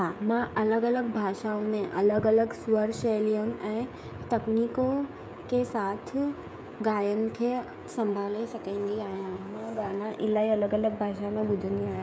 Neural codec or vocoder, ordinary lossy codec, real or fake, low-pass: codec, 16 kHz, 16 kbps, FreqCodec, smaller model; none; fake; none